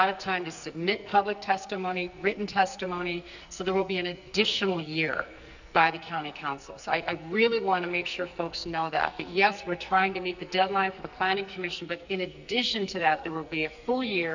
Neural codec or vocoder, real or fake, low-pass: codec, 44.1 kHz, 2.6 kbps, SNAC; fake; 7.2 kHz